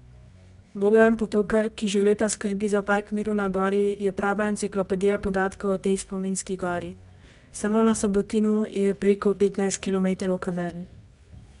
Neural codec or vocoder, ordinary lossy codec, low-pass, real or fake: codec, 24 kHz, 0.9 kbps, WavTokenizer, medium music audio release; none; 10.8 kHz; fake